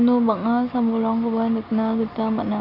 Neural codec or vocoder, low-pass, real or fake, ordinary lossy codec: none; 5.4 kHz; real; none